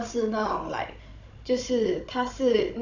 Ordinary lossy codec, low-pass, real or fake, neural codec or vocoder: none; 7.2 kHz; fake; codec, 16 kHz, 16 kbps, FunCodec, trained on Chinese and English, 50 frames a second